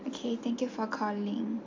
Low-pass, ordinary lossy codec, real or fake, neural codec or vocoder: 7.2 kHz; MP3, 48 kbps; real; none